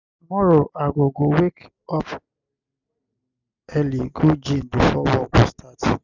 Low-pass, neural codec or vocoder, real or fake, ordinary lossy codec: 7.2 kHz; none; real; none